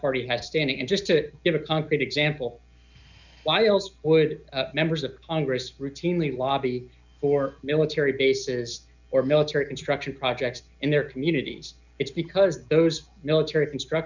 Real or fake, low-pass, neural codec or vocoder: real; 7.2 kHz; none